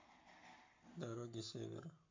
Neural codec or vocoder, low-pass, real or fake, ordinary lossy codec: codec, 44.1 kHz, 7.8 kbps, Pupu-Codec; 7.2 kHz; fake; MP3, 64 kbps